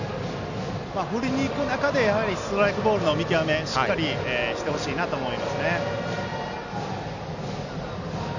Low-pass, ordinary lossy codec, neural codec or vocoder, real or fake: 7.2 kHz; none; none; real